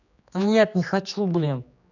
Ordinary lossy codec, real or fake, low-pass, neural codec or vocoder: none; fake; 7.2 kHz; codec, 16 kHz, 2 kbps, X-Codec, HuBERT features, trained on general audio